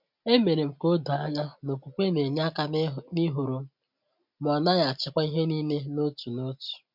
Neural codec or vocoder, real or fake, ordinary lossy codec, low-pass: none; real; MP3, 48 kbps; 5.4 kHz